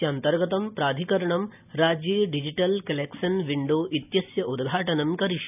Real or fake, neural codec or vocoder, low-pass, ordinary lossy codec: real; none; 3.6 kHz; none